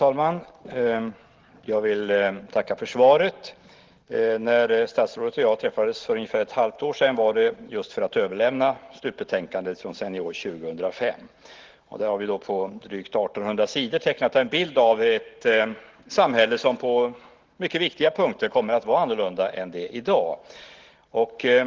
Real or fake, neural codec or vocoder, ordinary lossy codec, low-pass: real; none; Opus, 16 kbps; 7.2 kHz